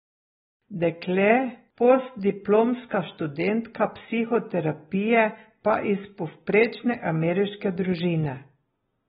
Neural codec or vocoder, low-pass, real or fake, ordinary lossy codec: none; 14.4 kHz; real; AAC, 16 kbps